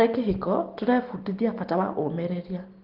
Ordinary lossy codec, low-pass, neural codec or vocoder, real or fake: Opus, 16 kbps; 5.4 kHz; none; real